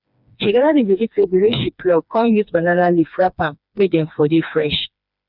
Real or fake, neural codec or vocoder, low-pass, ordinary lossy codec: fake; codec, 16 kHz, 2 kbps, FreqCodec, smaller model; 5.4 kHz; none